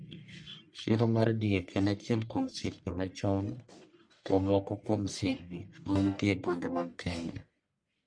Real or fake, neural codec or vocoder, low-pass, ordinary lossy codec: fake; codec, 44.1 kHz, 1.7 kbps, Pupu-Codec; 9.9 kHz; MP3, 48 kbps